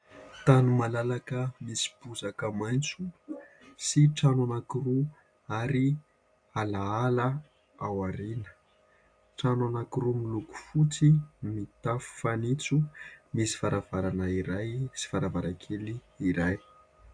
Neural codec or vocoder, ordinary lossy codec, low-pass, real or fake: none; AAC, 64 kbps; 9.9 kHz; real